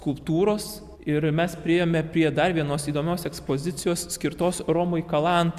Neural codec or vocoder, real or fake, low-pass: none; real; 14.4 kHz